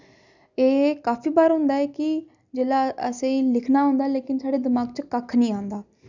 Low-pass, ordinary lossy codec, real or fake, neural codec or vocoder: 7.2 kHz; none; real; none